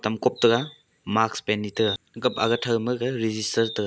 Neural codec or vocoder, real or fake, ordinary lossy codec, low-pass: none; real; none; none